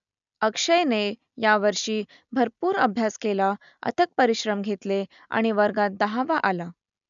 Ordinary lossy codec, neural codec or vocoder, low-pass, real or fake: MP3, 96 kbps; none; 7.2 kHz; real